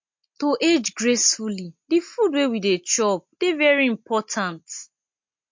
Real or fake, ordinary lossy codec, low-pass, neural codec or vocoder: real; MP3, 48 kbps; 7.2 kHz; none